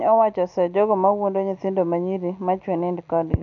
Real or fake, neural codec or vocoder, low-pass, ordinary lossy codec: real; none; 7.2 kHz; none